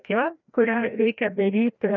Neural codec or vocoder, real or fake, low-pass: codec, 16 kHz, 1 kbps, FreqCodec, larger model; fake; 7.2 kHz